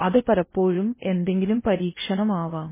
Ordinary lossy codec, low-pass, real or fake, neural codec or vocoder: MP3, 16 kbps; 3.6 kHz; fake; codec, 16 kHz in and 24 kHz out, 0.8 kbps, FocalCodec, streaming, 65536 codes